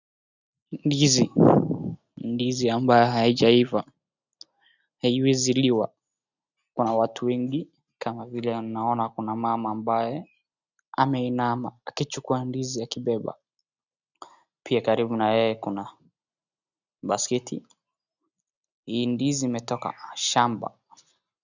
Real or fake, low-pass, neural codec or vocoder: real; 7.2 kHz; none